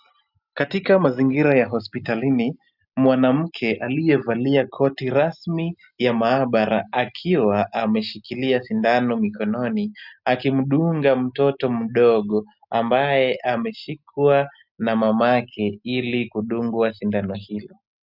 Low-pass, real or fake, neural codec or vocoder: 5.4 kHz; real; none